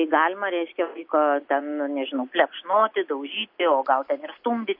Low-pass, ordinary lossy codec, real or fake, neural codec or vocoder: 5.4 kHz; MP3, 32 kbps; real; none